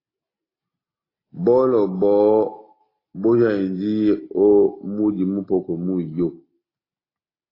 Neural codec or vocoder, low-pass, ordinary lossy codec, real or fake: none; 5.4 kHz; AAC, 24 kbps; real